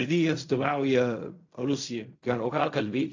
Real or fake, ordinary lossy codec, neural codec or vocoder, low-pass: fake; AAC, 48 kbps; codec, 16 kHz in and 24 kHz out, 0.4 kbps, LongCat-Audio-Codec, fine tuned four codebook decoder; 7.2 kHz